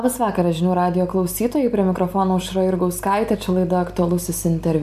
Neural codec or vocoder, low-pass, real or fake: none; 14.4 kHz; real